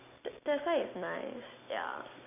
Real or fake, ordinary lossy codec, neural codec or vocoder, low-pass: real; none; none; 3.6 kHz